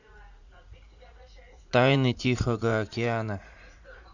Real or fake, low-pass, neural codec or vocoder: fake; 7.2 kHz; vocoder, 44.1 kHz, 80 mel bands, Vocos